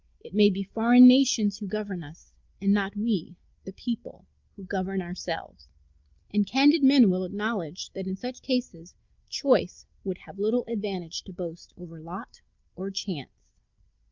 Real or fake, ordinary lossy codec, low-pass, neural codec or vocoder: real; Opus, 24 kbps; 7.2 kHz; none